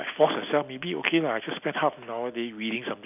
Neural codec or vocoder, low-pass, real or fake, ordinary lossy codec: none; 3.6 kHz; real; none